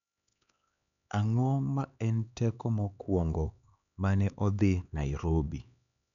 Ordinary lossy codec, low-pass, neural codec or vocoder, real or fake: none; 7.2 kHz; codec, 16 kHz, 4 kbps, X-Codec, HuBERT features, trained on LibriSpeech; fake